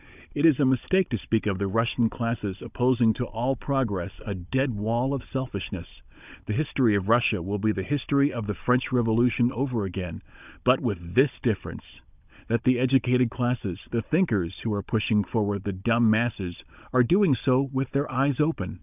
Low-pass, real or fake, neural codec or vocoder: 3.6 kHz; fake; codec, 16 kHz, 16 kbps, FunCodec, trained on Chinese and English, 50 frames a second